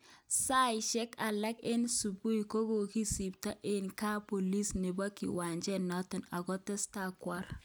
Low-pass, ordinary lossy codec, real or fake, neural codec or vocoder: none; none; real; none